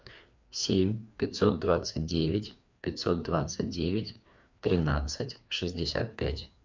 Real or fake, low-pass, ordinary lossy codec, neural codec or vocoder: fake; 7.2 kHz; MP3, 64 kbps; codec, 16 kHz, 2 kbps, FreqCodec, larger model